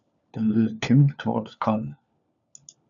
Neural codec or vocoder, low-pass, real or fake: codec, 16 kHz, 4 kbps, FunCodec, trained on LibriTTS, 50 frames a second; 7.2 kHz; fake